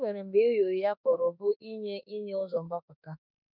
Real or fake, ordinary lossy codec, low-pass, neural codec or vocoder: fake; none; 5.4 kHz; autoencoder, 48 kHz, 32 numbers a frame, DAC-VAE, trained on Japanese speech